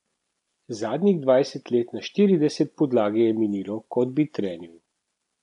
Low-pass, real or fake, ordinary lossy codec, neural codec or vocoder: 10.8 kHz; real; none; none